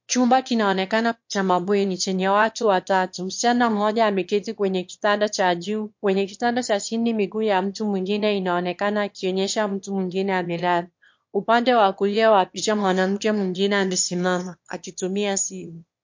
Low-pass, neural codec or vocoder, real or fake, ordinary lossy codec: 7.2 kHz; autoencoder, 22.05 kHz, a latent of 192 numbers a frame, VITS, trained on one speaker; fake; MP3, 48 kbps